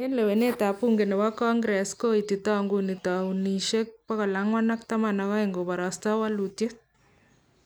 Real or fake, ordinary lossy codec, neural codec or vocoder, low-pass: real; none; none; none